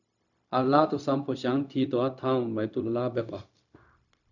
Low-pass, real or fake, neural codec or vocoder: 7.2 kHz; fake; codec, 16 kHz, 0.4 kbps, LongCat-Audio-Codec